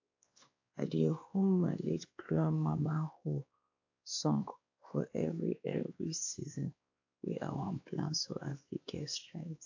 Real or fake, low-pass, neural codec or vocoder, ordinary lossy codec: fake; 7.2 kHz; codec, 16 kHz, 2 kbps, X-Codec, WavLM features, trained on Multilingual LibriSpeech; AAC, 48 kbps